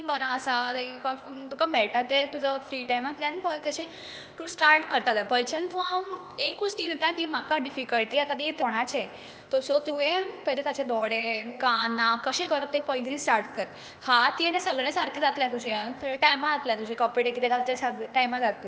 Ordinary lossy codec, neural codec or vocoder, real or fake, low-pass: none; codec, 16 kHz, 0.8 kbps, ZipCodec; fake; none